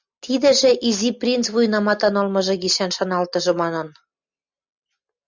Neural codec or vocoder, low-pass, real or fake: none; 7.2 kHz; real